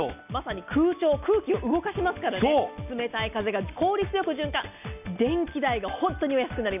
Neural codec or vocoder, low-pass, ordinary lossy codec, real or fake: none; 3.6 kHz; none; real